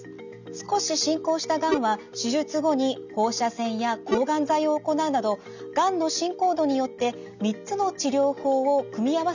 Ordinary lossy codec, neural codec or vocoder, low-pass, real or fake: none; none; 7.2 kHz; real